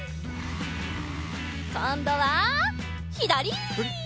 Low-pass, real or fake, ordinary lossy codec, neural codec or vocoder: none; real; none; none